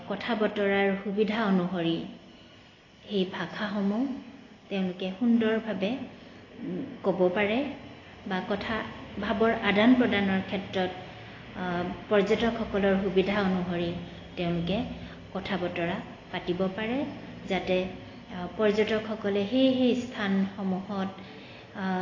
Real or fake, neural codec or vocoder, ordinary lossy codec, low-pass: real; none; AAC, 32 kbps; 7.2 kHz